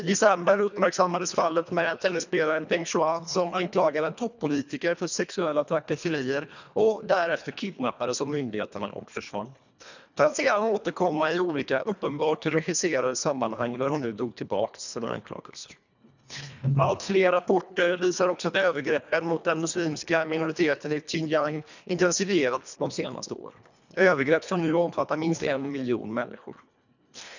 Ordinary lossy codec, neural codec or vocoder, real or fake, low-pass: none; codec, 24 kHz, 1.5 kbps, HILCodec; fake; 7.2 kHz